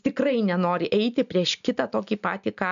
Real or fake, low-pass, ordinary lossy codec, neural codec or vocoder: real; 7.2 kHz; AAC, 96 kbps; none